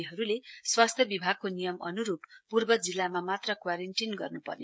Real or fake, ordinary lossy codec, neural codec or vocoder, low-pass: fake; none; codec, 16 kHz, 16 kbps, FreqCodec, smaller model; none